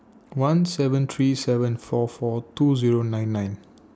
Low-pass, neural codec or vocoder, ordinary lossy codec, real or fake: none; none; none; real